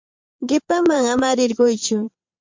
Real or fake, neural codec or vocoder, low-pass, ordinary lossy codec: fake; vocoder, 22.05 kHz, 80 mel bands, Vocos; 7.2 kHz; MP3, 64 kbps